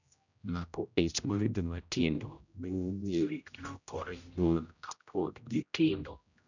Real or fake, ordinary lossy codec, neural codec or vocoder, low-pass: fake; none; codec, 16 kHz, 0.5 kbps, X-Codec, HuBERT features, trained on general audio; 7.2 kHz